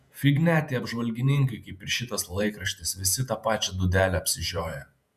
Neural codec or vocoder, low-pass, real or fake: vocoder, 48 kHz, 128 mel bands, Vocos; 14.4 kHz; fake